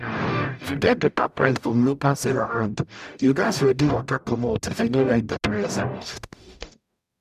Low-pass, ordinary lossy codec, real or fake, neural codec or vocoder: 14.4 kHz; none; fake; codec, 44.1 kHz, 0.9 kbps, DAC